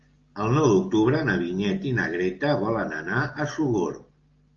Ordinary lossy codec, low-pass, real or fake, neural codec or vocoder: Opus, 32 kbps; 7.2 kHz; real; none